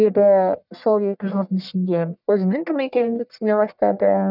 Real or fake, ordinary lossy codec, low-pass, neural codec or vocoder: fake; none; 5.4 kHz; codec, 44.1 kHz, 1.7 kbps, Pupu-Codec